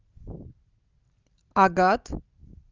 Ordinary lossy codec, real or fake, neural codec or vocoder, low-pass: Opus, 32 kbps; real; none; 7.2 kHz